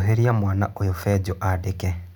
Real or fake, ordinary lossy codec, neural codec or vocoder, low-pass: real; none; none; none